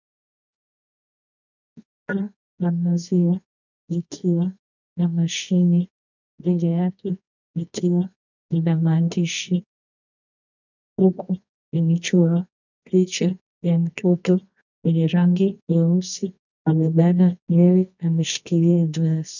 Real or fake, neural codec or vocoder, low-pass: fake; codec, 24 kHz, 0.9 kbps, WavTokenizer, medium music audio release; 7.2 kHz